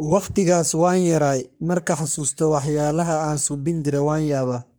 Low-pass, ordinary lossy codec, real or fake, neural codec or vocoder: none; none; fake; codec, 44.1 kHz, 3.4 kbps, Pupu-Codec